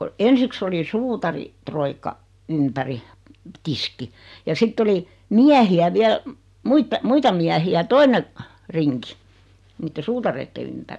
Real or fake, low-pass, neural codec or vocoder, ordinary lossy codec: real; none; none; none